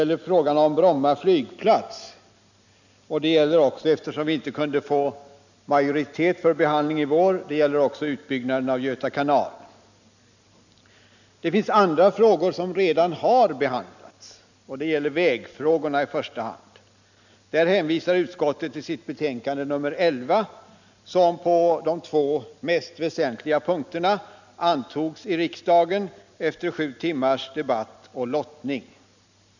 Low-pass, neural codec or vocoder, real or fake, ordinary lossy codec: 7.2 kHz; none; real; none